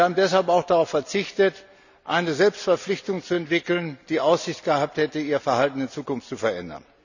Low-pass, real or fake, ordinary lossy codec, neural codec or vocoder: 7.2 kHz; real; none; none